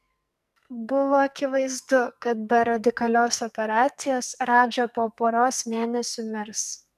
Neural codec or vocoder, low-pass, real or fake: codec, 44.1 kHz, 2.6 kbps, SNAC; 14.4 kHz; fake